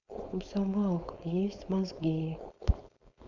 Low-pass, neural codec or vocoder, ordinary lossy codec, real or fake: 7.2 kHz; codec, 16 kHz, 4.8 kbps, FACodec; none; fake